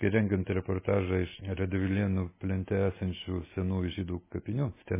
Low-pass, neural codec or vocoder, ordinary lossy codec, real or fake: 3.6 kHz; none; MP3, 16 kbps; real